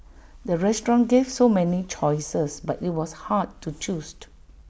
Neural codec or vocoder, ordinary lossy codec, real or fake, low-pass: none; none; real; none